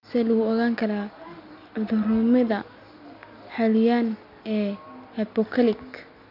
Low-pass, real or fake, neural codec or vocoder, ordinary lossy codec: 5.4 kHz; real; none; none